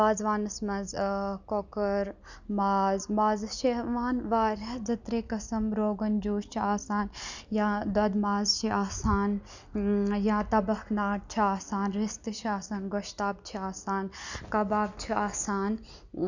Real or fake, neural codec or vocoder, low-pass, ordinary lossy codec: real; none; 7.2 kHz; none